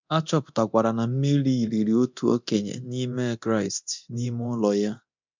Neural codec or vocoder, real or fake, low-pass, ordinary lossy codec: codec, 24 kHz, 0.9 kbps, DualCodec; fake; 7.2 kHz; MP3, 64 kbps